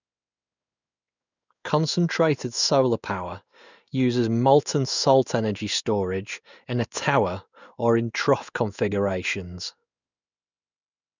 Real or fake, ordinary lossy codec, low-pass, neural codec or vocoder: fake; none; 7.2 kHz; codec, 16 kHz in and 24 kHz out, 1 kbps, XY-Tokenizer